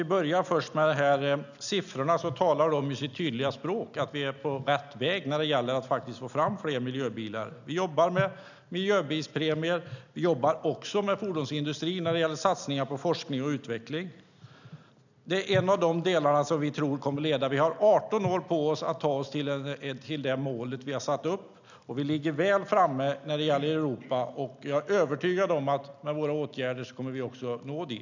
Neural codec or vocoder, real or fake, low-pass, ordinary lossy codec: none; real; 7.2 kHz; none